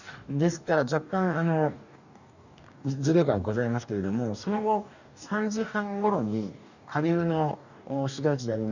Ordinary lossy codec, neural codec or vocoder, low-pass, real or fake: none; codec, 44.1 kHz, 2.6 kbps, DAC; 7.2 kHz; fake